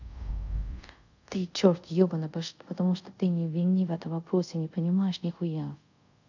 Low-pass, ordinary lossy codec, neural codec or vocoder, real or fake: 7.2 kHz; none; codec, 24 kHz, 0.5 kbps, DualCodec; fake